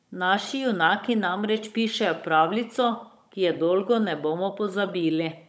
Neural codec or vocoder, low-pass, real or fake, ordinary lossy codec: codec, 16 kHz, 16 kbps, FunCodec, trained on Chinese and English, 50 frames a second; none; fake; none